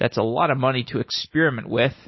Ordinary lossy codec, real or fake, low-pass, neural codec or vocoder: MP3, 24 kbps; real; 7.2 kHz; none